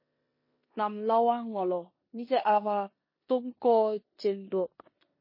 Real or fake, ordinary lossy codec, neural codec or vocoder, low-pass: fake; MP3, 24 kbps; codec, 16 kHz in and 24 kHz out, 0.9 kbps, LongCat-Audio-Codec, four codebook decoder; 5.4 kHz